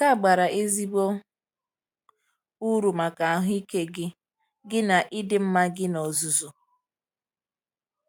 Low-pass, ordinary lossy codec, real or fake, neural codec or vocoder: none; none; real; none